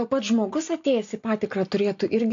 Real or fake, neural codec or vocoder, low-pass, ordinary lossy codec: real; none; 7.2 kHz; AAC, 48 kbps